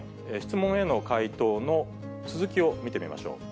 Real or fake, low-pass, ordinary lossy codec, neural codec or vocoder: real; none; none; none